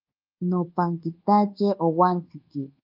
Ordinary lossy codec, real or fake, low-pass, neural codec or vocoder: Opus, 24 kbps; fake; 5.4 kHz; codec, 24 kHz, 1.2 kbps, DualCodec